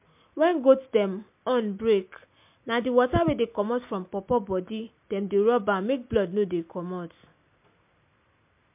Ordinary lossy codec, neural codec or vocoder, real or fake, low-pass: MP3, 32 kbps; none; real; 3.6 kHz